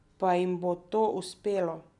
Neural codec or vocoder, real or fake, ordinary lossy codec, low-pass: none; real; none; 10.8 kHz